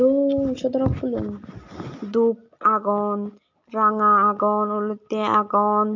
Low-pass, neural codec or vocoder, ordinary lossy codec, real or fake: 7.2 kHz; none; MP3, 48 kbps; real